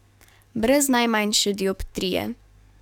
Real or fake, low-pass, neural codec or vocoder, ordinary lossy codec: fake; 19.8 kHz; codec, 44.1 kHz, 7.8 kbps, DAC; none